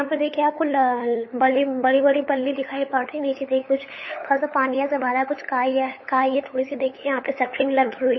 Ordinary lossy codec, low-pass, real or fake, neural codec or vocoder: MP3, 24 kbps; 7.2 kHz; fake; codec, 16 kHz, 8 kbps, FunCodec, trained on LibriTTS, 25 frames a second